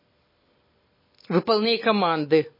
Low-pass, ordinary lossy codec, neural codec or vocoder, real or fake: 5.4 kHz; MP3, 24 kbps; none; real